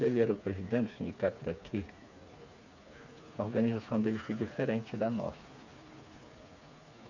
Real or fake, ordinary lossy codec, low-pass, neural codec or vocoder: fake; none; 7.2 kHz; codec, 16 kHz, 4 kbps, FreqCodec, smaller model